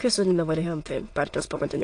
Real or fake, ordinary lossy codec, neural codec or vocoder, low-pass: fake; AAC, 48 kbps; autoencoder, 22.05 kHz, a latent of 192 numbers a frame, VITS, trained on many speakers; 9.9 kHz